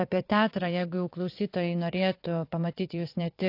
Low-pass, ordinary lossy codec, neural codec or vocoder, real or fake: 5.4 kHz; AAC, 32 kbps; vocoder, 22.05 kHz, 80 mel bands, WaveNeXt; fake